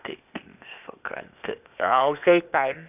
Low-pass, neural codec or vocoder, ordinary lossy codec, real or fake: 3.6 kHz; codec, 16 kHz, 0.8 kbps, ZipCodec; none; fake